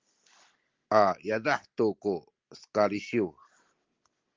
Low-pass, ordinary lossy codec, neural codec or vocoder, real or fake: 7.2 kHz; Opus, 32 kbps; none; real